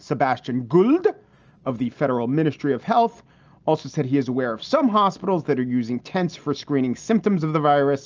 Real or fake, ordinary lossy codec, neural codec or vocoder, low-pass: real; Opus, 24 kbps; none; 7.2 kHz